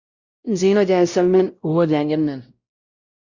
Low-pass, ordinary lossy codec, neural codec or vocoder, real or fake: 7.2 kHz; Opus, 64 kbps; codec, 16 kHz, 0.5 kbps, X-Codec, WavLM features, trained on Multilingual LibriSpeech; fake